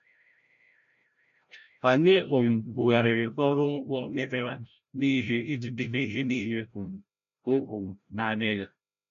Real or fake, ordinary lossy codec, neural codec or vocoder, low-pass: fake; MP3, 96 kbps; codec, 16 kHz, 0.5 kbps, FreqCodec, larger model; 7.2 kHz